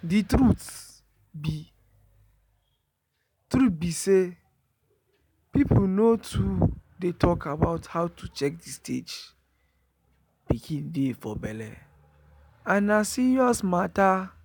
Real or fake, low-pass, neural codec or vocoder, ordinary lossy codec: real; 19.8 kHz; none; none